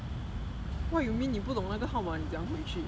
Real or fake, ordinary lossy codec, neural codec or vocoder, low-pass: real; none; none; none